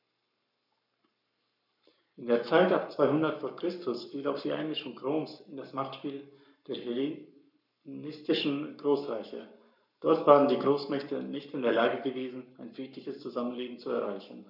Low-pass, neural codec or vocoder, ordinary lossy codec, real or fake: 5.4 kHz; codec, 44.1 kHz, 7.8 kbps, Pupu-Codec; none; fake